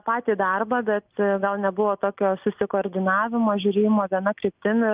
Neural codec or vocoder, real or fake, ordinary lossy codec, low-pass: none; real; Opus, 24 kbps; 3.6 kHz